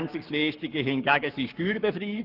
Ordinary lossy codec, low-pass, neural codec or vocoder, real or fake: Opus, 32 kbps; 5.4 kHz; codec, 44.1 kHz, 7.8 kbps, Pupu-Codec; fake